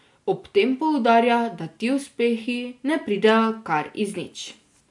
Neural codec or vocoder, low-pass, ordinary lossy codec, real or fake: none; 10.8 kHz; MP3, 64 kbps; real